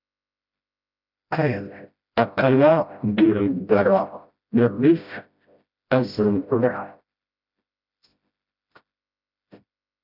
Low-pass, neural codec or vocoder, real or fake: 5.4 kHz; codec, 16 kHz, 0.5 kbps, FreqCodec, smaller model; fake